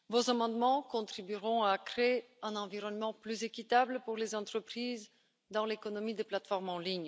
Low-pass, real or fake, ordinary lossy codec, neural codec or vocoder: none; real; none; none